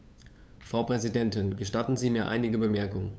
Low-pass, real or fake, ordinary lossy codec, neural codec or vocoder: none; fake; none; codec, 16 kHz, 8 kbps, FunCodec, trained on LibriTTS, 25 frames a second